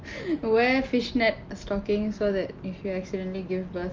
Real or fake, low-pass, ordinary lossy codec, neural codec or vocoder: real; 7.2 kHz; Opus, 24 kbps; none